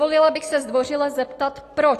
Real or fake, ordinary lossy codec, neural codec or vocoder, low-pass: real; AAC, 48 kbps; none; 14.4 kHz